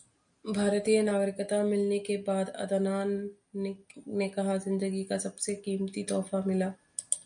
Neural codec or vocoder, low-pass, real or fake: none; 9.9 kHz; real